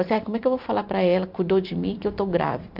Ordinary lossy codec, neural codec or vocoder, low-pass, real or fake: none; vocoder, 44.1 kHz, 128 mel bands every 512 samples, BigVGAN v2; 5.4 kHz; fake